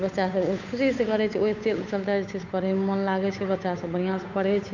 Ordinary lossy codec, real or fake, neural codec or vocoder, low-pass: none; fake; codec, 16 kHz, 8 kbps, FunCodec, trained on Chinese and English, 25 frames a second; 7.2 kHz